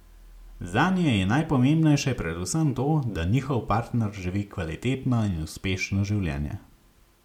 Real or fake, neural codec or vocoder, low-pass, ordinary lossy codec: real; none; 19.8 kHz; MP3, 96 kbps